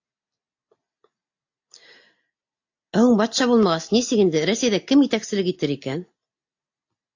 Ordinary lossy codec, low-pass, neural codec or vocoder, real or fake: AAC, 48 kbps; 7.2 kHz; none; real